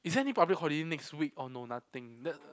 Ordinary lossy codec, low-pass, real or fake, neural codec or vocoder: none; none; real; none